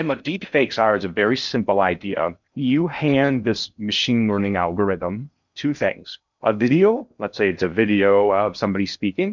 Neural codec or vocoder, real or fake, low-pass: codec, 16 kHz in and 24 kHz out, 0.6 kbps, FocalCodec, streaming, 4096 codes; fake; 7.2 kHz